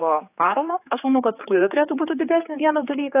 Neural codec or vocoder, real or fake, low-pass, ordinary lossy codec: codec, 16 kHz, 4 kbps, X-Codec, HuBERT features, trained on general audio; fake; 3.6 kHz; AAC, 24 kbps